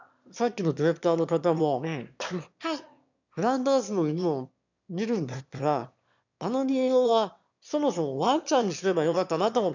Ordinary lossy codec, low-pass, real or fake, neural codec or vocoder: none; 7.2 kHz; fake; autoencoder, 22.05 kHz, a latent of 192 numbers a frame, VITS, trained on one speaker